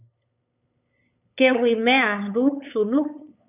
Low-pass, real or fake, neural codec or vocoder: 3.6 kHz; fake; codec, 16 kHz, 8 kbps, FunCodec, trained on LibriTTS, 25 frames a second